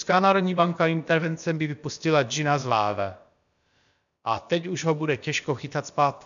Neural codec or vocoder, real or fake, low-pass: codec, 16 kHz, about 1 kbps, DyCAST, with the encoder's durations; fake; 7.2 kHz